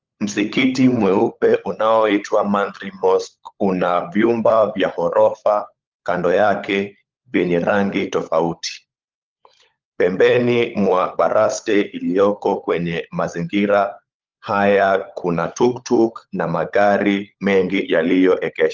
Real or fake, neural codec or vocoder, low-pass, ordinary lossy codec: fake; codec, 16 kHz, 16 kbps, FunCodec, trained on LibriTTS, 50 frames a second; 7.2 kHz; Opus, 32 kbps